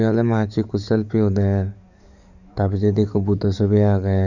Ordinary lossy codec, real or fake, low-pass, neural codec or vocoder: none; fake; 7.2 kHz; codec, 44.1 kHz, 7.8 kbps, DAC